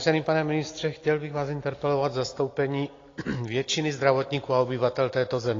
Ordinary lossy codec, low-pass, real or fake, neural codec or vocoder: AAC, 32 kbps; 7.2 kHz; real; none